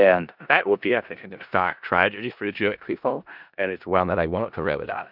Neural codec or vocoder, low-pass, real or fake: codec, 16 kHz in and 24 kHz out, 0.4 kbps, LongCat-Audio-Codec, four codebook decoder; 5.4 kHz; fake